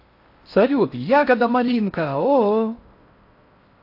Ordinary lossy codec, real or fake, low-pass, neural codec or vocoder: AAC, 32 kbps; fake; 5.4 kHz; codec, 16 kHz in and 24 kHz out, 0.6 kbps, FocalCodec, streaming, 4096 codes